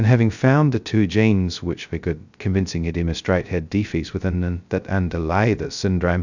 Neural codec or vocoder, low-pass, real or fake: codec, 16 kHz, 0.2 kbps, FocalCodec; 7.2 kHz; fake